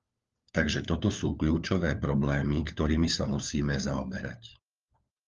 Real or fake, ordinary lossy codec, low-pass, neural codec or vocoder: fake; Opus, 32 kbps; 7.2 kHz; codec, 16 kHz, 4 kbps, FunCodec, trained on LibriTTS, 50 frames a second